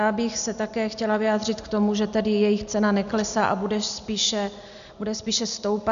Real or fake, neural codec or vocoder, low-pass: real; none; 7.2 kHz